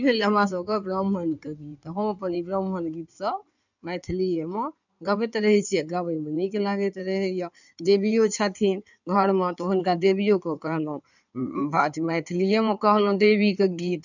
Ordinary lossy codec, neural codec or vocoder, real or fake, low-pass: none; codec, 16 kHz in and 24 kHz out, 2.2 kbps, FireRedTTS-2 codec; fake; 7.2 kHz